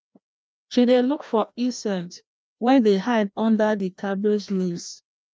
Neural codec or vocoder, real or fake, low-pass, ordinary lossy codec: codec, 16 kHz, 1 kbps, FreqCodec, larger model; fake; none; none